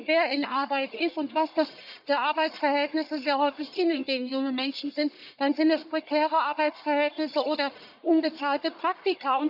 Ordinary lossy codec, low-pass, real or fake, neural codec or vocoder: none; 5.4 kHz; fake; codec, 44.1 kHz, 1.7 kbps, Pupu-Codec